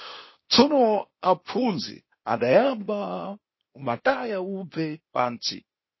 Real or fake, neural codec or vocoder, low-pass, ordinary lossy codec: fake; codec, 16 kHz, 0.8 kbps, ZipCodec; 7.2 kHz; MP3, 24 kbps